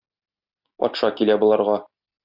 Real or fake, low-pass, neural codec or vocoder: real; 5.4 kHz; none